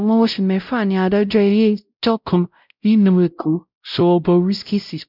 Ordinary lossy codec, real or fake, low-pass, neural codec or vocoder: none; fake; 5.4 kHz; codec, 16 kHz, 0.5 kbps, X-Codec, WavLM features, trained on Multilingual LibriSpeech